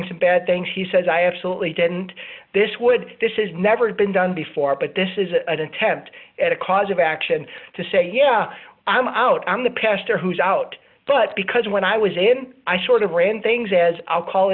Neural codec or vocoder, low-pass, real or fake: none; 5.4 kHz; real